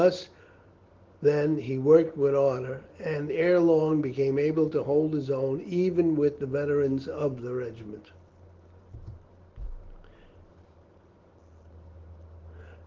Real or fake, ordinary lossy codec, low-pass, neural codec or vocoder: real; Opus, 16 kbps; 7.2 kHz; none